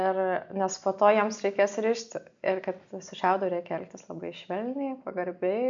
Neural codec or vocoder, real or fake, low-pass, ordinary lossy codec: none; real; 7.2 kHz; MP3, 64 kbps